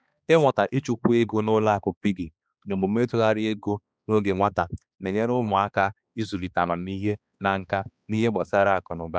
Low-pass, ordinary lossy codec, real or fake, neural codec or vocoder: none; none; fake; codec, 16 kHz, 2 kbps, X-Codec, HuBERT features, trained on balanced general audio